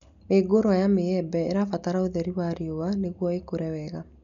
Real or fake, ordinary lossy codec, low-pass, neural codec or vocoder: real; none; 7.2 kHz; none